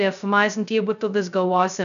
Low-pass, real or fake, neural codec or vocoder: 7.2 kHz; fake; codec, 16 kHz, 0.2 kbps, FocalCodec